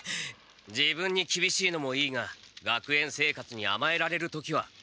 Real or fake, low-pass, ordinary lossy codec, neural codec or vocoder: real; none; none; none